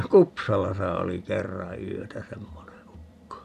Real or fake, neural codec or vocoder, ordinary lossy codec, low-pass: fake; vocoder, 44.1 kHz, 128 mel bands every 256 samples, BigVGAN v2; none; 14.4 kHz